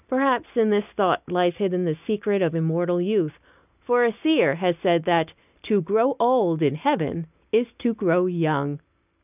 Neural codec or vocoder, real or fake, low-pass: none; real; 3.6 kHz